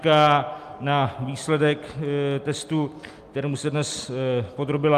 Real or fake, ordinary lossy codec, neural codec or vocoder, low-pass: real; Opus, 32 kbps; none; 14.4 kHz